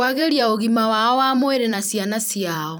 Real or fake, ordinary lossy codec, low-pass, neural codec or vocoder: fake; none; none; vocoder, 44.1 kHz, 128 mel bands every 256 samples, BigVGAN v2